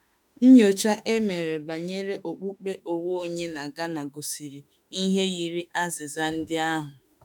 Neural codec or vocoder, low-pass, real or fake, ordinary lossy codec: autoencoder, 48 kHz, 32 numbers a frame, DAC-VAE, trained on Japanese speech; 19.8 kHz; fake; none